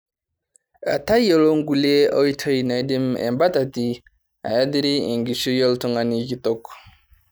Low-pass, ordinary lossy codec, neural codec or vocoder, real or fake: none; none; none; real